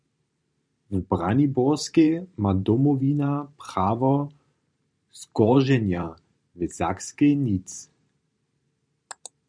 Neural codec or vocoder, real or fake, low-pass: vocoder, 44.1 kHz, 128 mel bands every 512 samples, BigVGAN v2; fake; 9.9 kHz